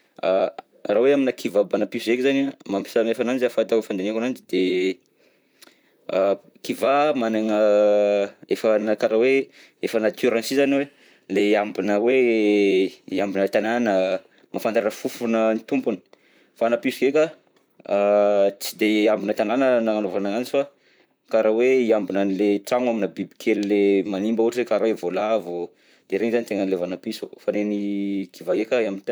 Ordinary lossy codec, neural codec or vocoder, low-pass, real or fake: none; vocoder, 44.1 kHz, 128 mel bands, Pupu-Vocoder; none; fake